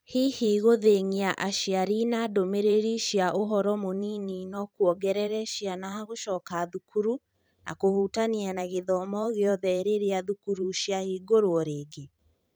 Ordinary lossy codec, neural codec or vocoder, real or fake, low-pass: none; vocoder, 44.1 kHz, 128 mel bands every 512 samples, BigVGAN v2; fake; none